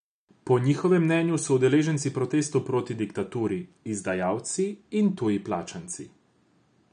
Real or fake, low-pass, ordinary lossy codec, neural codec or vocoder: real; 9.9 kHz; none; none